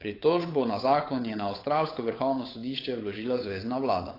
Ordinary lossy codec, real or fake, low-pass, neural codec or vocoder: AAC, 24 kbps; fake; 5.4 kHz; codec, 16 kHz, 8 kbps, FunCodec, trained on Chinese and English, 25 frames a second